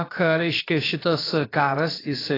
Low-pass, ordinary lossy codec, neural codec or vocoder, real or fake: 5.4 kHz; AAC, 24 kbps; codec, 16 kHz, about 1 kbps, DyCAST, with the encoder's durations; fake